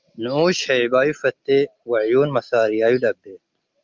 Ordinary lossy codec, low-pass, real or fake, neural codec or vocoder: Opus, 24 kbps; 7.2 kHz; real; none